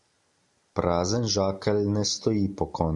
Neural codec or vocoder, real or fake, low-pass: none; real; 10.8 kHz